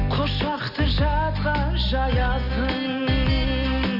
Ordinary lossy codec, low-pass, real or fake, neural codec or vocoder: none; 5.4 kHz; real; none